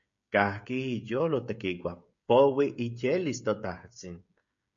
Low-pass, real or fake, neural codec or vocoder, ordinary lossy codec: 7.2 kHz; fake; codec, 16 kHz, 16 kbps, FreqCodec, smaller model; MP3, 64 kbps